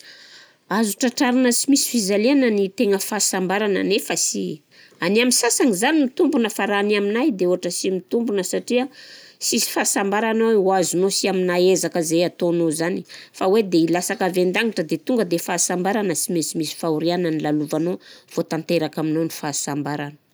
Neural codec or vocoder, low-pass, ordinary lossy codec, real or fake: none; none; none; real